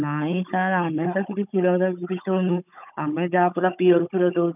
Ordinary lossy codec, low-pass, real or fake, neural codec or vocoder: none; 3.6 kHz; fake; codec, 16 kHz, 16 kbps, FunCodec, trained on Chinese and English, 50 frames a second